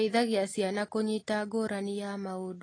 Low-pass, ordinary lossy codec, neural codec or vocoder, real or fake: 9.9 kHz; AAC, 32 kbps; none; real